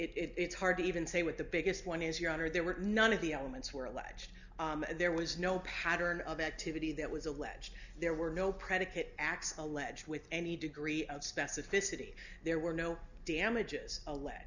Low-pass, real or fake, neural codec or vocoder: 7.2 kHz; real; none